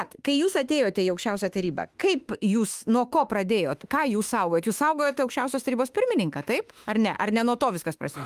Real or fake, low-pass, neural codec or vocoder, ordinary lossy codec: fake; 14.4 kHz; autoencoder, 48 kHz, 32 numbers a frame, DAC-VAE, trained on Japanese speech; Opus, 32 kbps